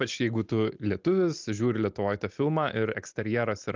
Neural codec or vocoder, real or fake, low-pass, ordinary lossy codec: none; real; 7.2 kHz; Opus, 32 kbps